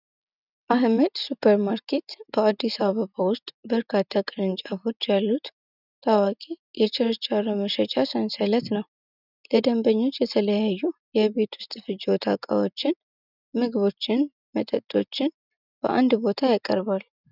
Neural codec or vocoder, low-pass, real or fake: none; 5.4 kHz; real